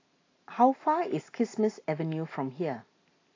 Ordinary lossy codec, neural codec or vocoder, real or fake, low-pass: AAC, 32 kbps; none; real; 7.2 kHz